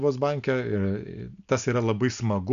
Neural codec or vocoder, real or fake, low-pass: none; real; 7.2 kHz